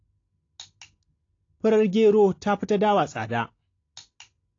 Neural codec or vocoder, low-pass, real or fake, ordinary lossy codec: none; 7.2 kHz; real; AAC, 32 kbps